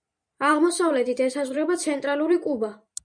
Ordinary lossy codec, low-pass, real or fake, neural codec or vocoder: MP3, 96 kbps; 9.9 kHz; fake; vocoder, 44.1 kHz, 128 mel bands every 512 samples, BigVGAN v2